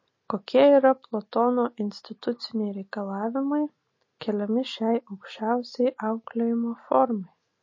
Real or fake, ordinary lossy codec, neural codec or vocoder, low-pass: real; MP3, 32 kbps; none; 7.2 kHz